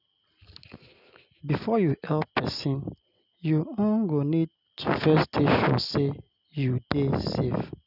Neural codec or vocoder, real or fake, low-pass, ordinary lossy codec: none; real; 5.4 kHz; none